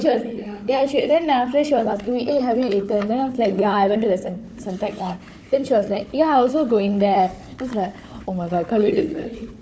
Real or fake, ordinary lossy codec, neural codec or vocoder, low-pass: fake; none; codec, 16 kHz, 4 kbps, FunCodec, trained on Chinese and English, 50 frames a second; none